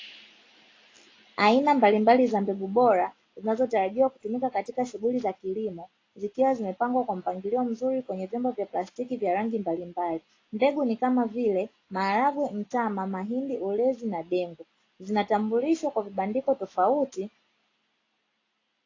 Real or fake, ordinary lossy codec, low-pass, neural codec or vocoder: real; AAC, 32 kbps; 7.2 kHz; none